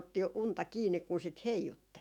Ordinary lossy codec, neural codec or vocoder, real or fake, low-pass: none; autoencoder, 48 kHz, 128 numbers a frame, DAC-VAE, trained on Japanese speech; fake; 19.8 kHz